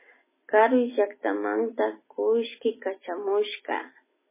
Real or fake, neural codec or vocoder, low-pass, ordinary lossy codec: real; none; 3.6 kHz; MP3, 16 kbps